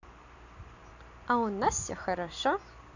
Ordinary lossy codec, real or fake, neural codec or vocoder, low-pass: none; real; none; 7.2 kHz